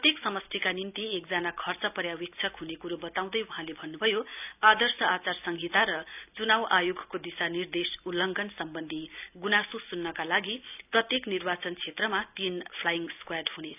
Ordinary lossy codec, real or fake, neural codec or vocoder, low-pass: none; real; none; 3.6 kHz